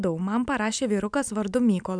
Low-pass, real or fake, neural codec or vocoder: 9.9 kHz; real; none